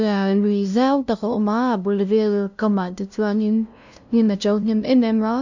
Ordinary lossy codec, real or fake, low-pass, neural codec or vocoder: none; fake; 7.2 kHz; codec, 16 kHz, 0.5 kbps, FunCodec, trained on LibriTTS, 25 frames a second